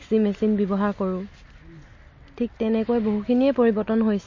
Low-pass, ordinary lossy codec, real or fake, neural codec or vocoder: 7.2 kHz; MP3, 32 kbps; real; none